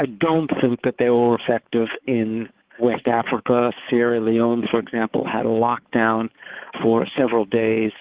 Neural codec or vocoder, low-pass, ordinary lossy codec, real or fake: codec, 16 kHz, 4 kbps, X-Codec, HuBERT features, trained on balanced general audio; 3.6 kHz; Opus, 16 kbps; fake